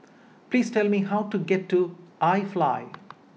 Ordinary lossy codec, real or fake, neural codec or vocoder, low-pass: none; real; none; none